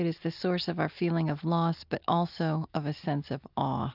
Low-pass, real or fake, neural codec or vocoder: 5.4 kHz; real; none